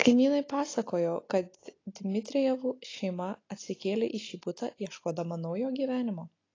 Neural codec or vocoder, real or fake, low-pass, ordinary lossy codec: none; real; 7.2 kHz; AAC, 32 kbps